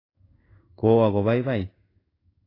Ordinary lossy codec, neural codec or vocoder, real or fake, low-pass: AAC, 24 kbps; codec, 16 kHz in and 24 kHz out, 1 kbps, XY-Tokenizer; fake; 5.4 kHz